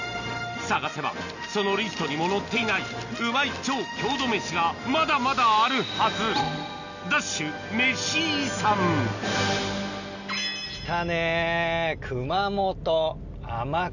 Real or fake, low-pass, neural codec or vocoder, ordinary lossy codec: real; 7.2 kHz; none; none